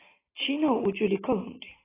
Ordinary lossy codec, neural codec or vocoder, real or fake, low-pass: AAC, 16 kbps; none; real; 3.6 kHz